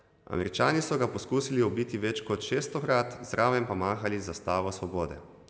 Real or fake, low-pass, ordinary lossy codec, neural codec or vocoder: real; none; none; none